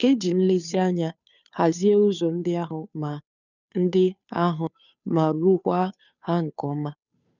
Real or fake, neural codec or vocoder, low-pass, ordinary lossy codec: fake; codec, 16 kHz, 2 kbps, FunCodec, trained on Chinese and English, 25 frames a second; 7.2 kHz; none